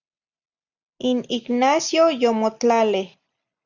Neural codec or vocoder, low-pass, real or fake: none; 7.2 kHz; real